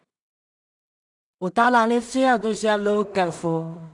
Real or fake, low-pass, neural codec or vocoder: fake; 10.8 kHz; codec, 16 kHz in and 24 kHz out, 0.4 kbps, LongCat-Audio-Codec, two codebook decoder